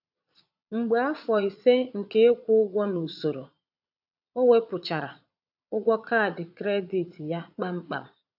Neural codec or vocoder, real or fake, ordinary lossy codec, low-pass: vocoder, 22.05 kHz, 80 mel bands, Vocos; fake; AAC, 48 kbps; 5.4 kHz